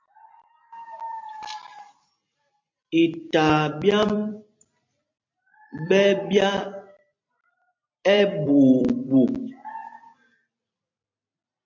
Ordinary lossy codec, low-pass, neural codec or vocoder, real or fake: MP3, 48 kbps; 7.2 kHz; vocoder, 44.1 kHz, 128 mel bands every 256 samples, BigVGAN v2; fake